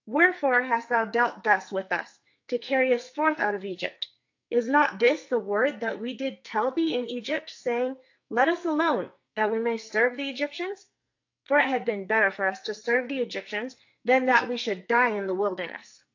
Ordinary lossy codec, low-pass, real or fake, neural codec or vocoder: AAC, 48 kbps; 7.2 kHz; fake; codec, 44.1 kHz, 2.6 kbps, SNAC